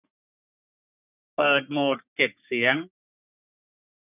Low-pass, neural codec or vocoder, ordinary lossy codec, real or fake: 3.6 kHz; codec, 44.1 kHz, 3.4 kbps, Pupu-Codec; none; fake